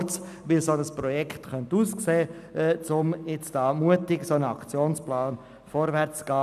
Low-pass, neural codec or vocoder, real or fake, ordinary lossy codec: 14.4 kHz; none; real; none